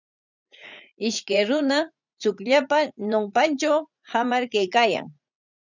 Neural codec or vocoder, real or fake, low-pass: vocoder, 44.1 kHz, 80 mel bands, Vocos; fake; 7.2 kHz